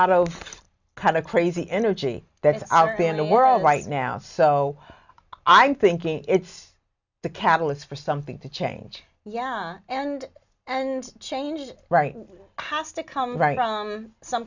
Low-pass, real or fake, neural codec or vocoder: 7.2 kHz; real; none